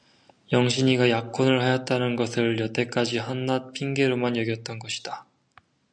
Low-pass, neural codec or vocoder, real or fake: 9.9 kHz; none; real